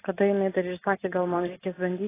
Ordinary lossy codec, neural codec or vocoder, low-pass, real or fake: AAC, 16 kbps; none; 3.6 kHz; real